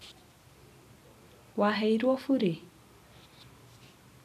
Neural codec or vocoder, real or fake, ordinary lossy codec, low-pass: none; real; none; 14.4 kHz